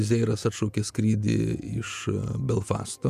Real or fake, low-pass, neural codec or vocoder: fake; 14.4 kHz; vocoder, 48 kHz, 128 mel bands, Vocos